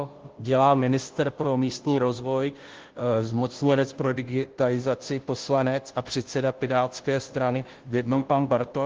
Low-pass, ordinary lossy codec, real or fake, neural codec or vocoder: 7.2 kHz; Opus, 16 kbps; fake; codec, 16 kHz, 0.5 kbps, FunCodec, trained on Chinese and English, 25 frames a second